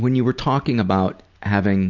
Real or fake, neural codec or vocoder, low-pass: real; none; 7.2 kHz